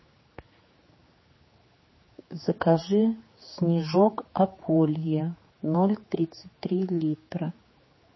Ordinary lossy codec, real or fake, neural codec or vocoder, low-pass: MP3, 24 kbps; fake; codec, 16 kHz, 4 kbps, X-Codec, HuBERT features, trained on general audio; 7.2 kHz